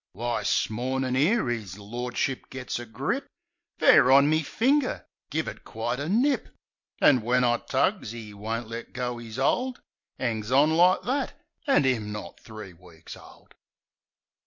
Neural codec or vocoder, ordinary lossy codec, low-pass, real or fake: none; MP3, 48 kbps; 7.2 kHz; real